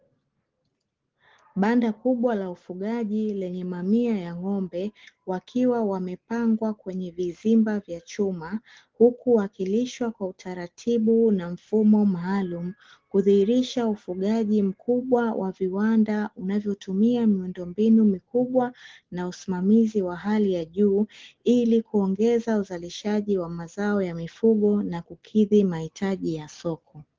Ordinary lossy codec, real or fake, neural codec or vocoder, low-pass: Opus, 16 kbps; real; none; 7.2 kHz